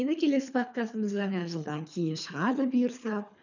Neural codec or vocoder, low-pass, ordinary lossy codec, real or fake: codec, 24 kHz, 3 kbps, HILCodec; 7.2 kHz; none; fake